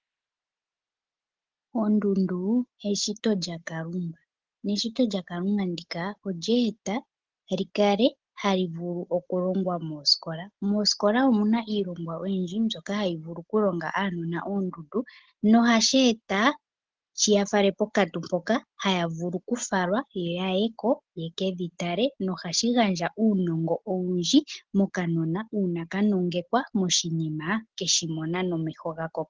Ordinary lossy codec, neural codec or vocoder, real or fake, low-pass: Opus, 16 kbps; none; real; 7.2 kHz